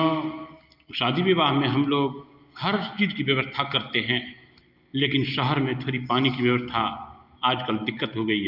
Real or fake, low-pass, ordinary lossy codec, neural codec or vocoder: real; 5.4 kHz; Opus, 24 kbps; none